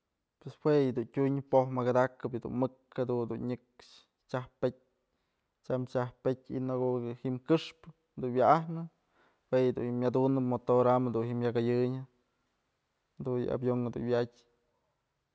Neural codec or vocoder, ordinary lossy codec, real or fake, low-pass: none; none; real; none